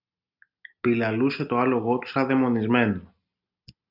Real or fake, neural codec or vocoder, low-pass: real; none; 5.4 kHz